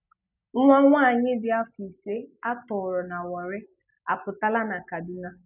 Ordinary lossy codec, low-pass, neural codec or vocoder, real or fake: none; 3.6 kHz; none; real